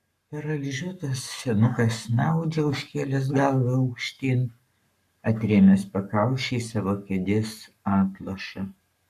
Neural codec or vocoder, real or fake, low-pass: codec, 44.1 kHz, 7.8 kbps, Pupu-Codec; fake; 14.4 kHz